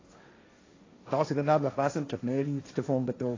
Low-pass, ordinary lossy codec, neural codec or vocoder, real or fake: 7.2 kHz; AAC, 32 kbps; codec, 16 kHz, 1.1 kbps, Voila-Tokenizer; fake